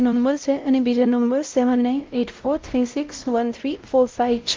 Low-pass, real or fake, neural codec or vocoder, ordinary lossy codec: 7.2 kHz; fake; codec, 16 kHz, 0.5 kbps, X-Codec, WavLM features, trained on Multilingual LibriSpeech; Opus, 24 kbps